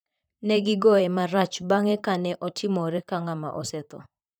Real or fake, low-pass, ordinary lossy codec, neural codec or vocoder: fake; none; none; vocoder, 44.1 kHz, 128 mel bands, Pupu-Vocoder